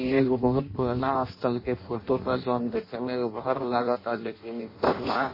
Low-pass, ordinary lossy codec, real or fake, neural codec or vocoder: 5.4 kHz; MP3, 24 kbps; fake; codec, 16 kHz in and 24 kHz out, 0.6 kbps, FireRedTTS-2 codec